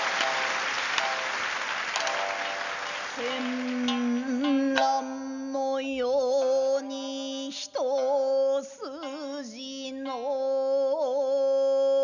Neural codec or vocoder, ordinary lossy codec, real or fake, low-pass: none; none; real; 7.2 kHz